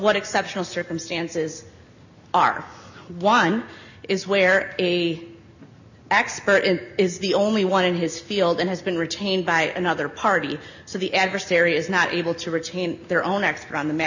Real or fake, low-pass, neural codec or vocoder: real; 7.2 kHz; none